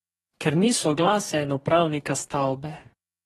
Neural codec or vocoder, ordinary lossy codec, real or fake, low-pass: codec, 44.1 kHz, 2.6 kbps, DAC; AAC, 32 kbps; fake; 19.8 kHz